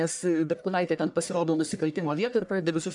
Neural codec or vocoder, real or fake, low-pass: codec, 44.1 kHz, 1.7 kbps, Pupu-Codec; fake; 10.8 kHz